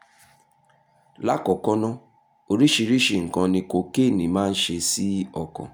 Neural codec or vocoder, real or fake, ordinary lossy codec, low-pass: none; real; none; none